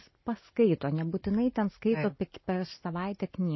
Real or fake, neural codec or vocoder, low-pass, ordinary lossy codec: real; none; 7.2 kHz; MP3, 24 kbps